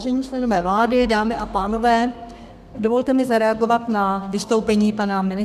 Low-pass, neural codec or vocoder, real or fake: 14.4 kHz; codec, 32 kHz, 1.9 kbps, SNAC; fake